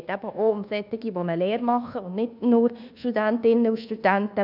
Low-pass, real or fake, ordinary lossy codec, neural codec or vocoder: 5.4 kHz; fake; none; codec, 24 kHz, 1.2 kbps, DualCodec